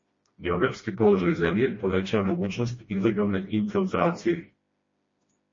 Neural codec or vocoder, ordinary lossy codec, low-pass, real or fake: codec, 16 kHz, 1 kbps, FreqCodec, smaller model; MP3, 32 kbps; 7.2 kHz; fake